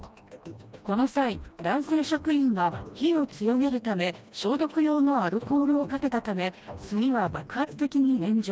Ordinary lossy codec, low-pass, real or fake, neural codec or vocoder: none; none; fake; codec, 16 kHz, 1 kbps, FreqCodec, smaller model